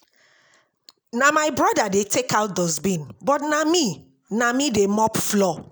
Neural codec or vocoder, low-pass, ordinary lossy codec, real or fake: none; none; none; real